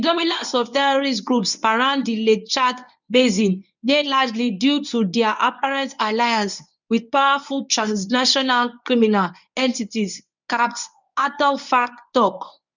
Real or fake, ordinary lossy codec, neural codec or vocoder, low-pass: fake; none; codec, 24 kHz, 0.9 kbps, WavTokenizer, medium speech release version 1; 7.2 kHz